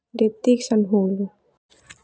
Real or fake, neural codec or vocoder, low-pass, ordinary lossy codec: real; none; none; none